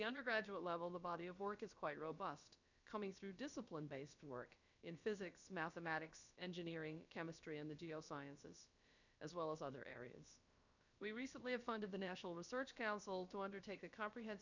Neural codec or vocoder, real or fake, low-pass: codec, 16 kHz, about 1 kbps, DyCAST, with the encoder's durations; fake; 7.2 kHz